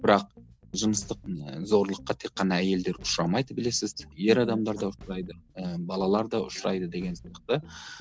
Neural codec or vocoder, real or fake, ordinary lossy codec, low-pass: none; real; none; none